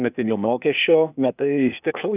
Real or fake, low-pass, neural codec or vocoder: fake; 3.6 kHz; codec, 16 kHz, 0.8 kbps, ZipCodec